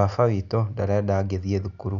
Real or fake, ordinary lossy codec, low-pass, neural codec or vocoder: real; none; 7.2 kHz; none